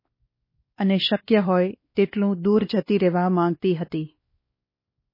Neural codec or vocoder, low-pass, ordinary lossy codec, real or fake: codec, 16 kHz, 2 kbps, X-Codec, WavLM features, trained on Multilingual LibriSpeech; 5.4 kHz; MP3, 24 kbps; fake